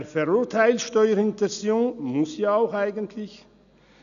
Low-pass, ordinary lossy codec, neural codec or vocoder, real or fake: 7.2 kHz; none; none; real